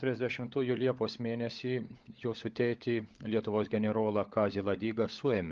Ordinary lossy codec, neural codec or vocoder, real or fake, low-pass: Opus, 32 kbps; codec, 16 kHz, 16 kbps, FunCodec, trained on LibriTTS, 50 frames a second; fake; 7.2 kHz